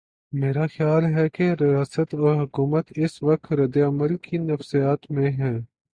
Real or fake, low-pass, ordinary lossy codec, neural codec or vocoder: real; 9.9 kHz; Opus, 64 kbps; none